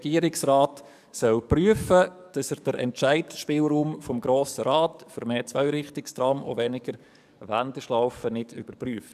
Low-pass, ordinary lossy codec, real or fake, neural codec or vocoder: 14.4 kHz; none; fake; codec, 44.1 kHz, 7.8 kbps, DAC